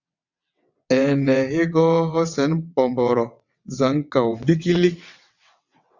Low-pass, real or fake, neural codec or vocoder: 7.2 kHz; fake; vocoder, 22.05 kHz, 80 mel bands, WaveNeXt